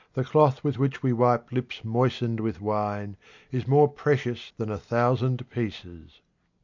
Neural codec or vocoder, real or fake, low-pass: none; real; 7.2 kHz